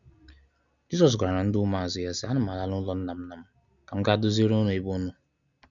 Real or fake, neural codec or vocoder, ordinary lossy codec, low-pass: real; none; none; 7.2 kHz